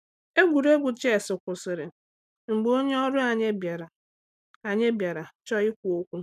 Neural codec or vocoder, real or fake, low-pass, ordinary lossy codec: none; real; 14.4 kHz; none